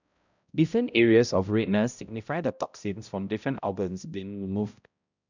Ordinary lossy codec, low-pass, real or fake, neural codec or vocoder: none; 7.2 kHz; fake; codec, 16 kHz, 0.5 kbps, X-Codec, HuBERT features, trained on balanced general audio